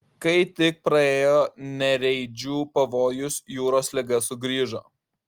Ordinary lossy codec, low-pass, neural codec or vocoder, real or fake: Opus, 24 kbps; 19.8 kHz; none; real